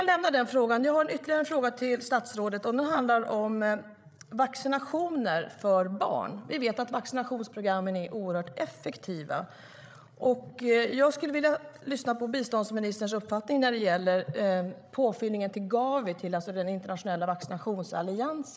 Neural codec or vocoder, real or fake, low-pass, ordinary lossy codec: codec, 16 kHz, 16 kbps, FreqCodec, larger model; fake; none; none